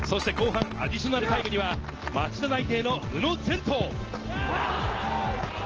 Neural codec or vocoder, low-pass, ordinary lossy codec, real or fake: none; 7.2 kHz; Opus, 16 kbps; real